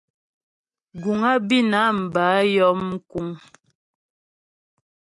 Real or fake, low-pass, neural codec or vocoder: real; 10.8 kHz; none